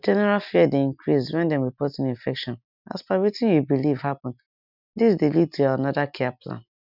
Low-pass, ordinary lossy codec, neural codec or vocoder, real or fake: 5.4 kHz; none; none; real